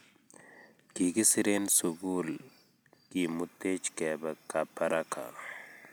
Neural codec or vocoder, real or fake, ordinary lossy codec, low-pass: none; real; none; none